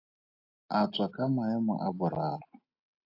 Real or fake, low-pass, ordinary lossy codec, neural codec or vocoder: real; 5.4 kHz; AAC, 32 kbps; none